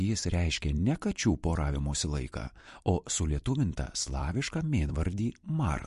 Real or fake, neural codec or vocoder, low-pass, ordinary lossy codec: fake; vocoder, 44.1 kHz, 128 mel bands every 256 samples, BigVGAN v2; 14.4 kHz; MP3, 48 kbps